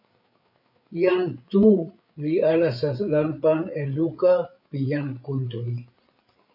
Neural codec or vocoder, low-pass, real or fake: codec, 16 kHz, 8 kbps, FreqCodec, larger model; 5.4 kHz; fake